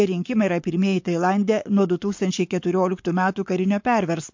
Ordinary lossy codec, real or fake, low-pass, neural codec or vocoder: MP3, 48 kbps; fake; 7.2 kHz; codec, 44.1 kHz, 7.8 kbps, Pupu-Codec